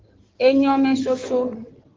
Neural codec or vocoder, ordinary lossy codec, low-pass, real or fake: codec, 16 kHz in and 24 kHz out, 2.2 kbps, FireRedTTS-2 codec; Opus, 16 kbps; 7.2 kHz; fake